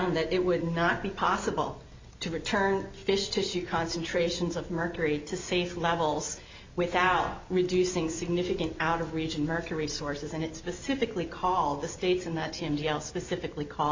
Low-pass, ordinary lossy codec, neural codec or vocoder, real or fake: 7.2 kHz; MP3, 48 kbps; none; real